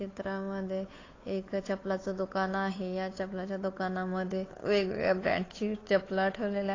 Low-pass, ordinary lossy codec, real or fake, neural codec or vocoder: 7.2 kHz; AAC, 32 kbps; fake; codec, 16 kHz, 8 kbps, FunCodec, trained on Chinese and English, 25 frames a second